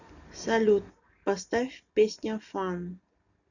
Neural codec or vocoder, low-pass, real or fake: none; 7.2 kHz; real